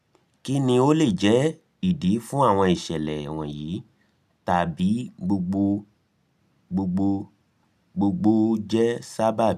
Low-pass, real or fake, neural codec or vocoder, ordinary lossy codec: 14.4 kHz; real; none; none